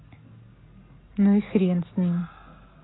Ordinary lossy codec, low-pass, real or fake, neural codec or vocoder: AAC, 16 kbps; 7.2 kHz; real; none